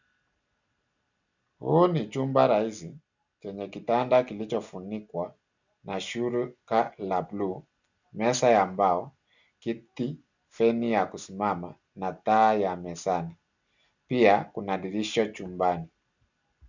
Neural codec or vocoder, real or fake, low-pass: none; real; 7.2 kHz